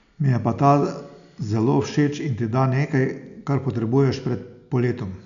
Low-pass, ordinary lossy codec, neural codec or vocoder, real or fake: 7.2 kHz; none; none; real